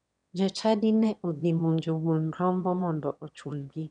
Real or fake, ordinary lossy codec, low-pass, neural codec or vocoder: fake; none; 9.9 kHz; autoencoder, 22.05 kHz, a latent of 192 numbers a frame, VITS, trained on one speaker